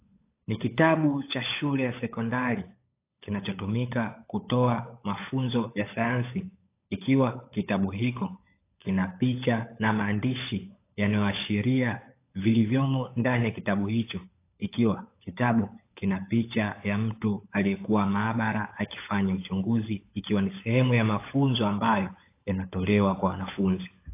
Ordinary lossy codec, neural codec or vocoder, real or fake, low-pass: AAC, 24 kbps; codec, 16 kHz, 16 kbps, FunCodec, trained on LibriTTS, 50 frames a second; fake; 3.6 kHz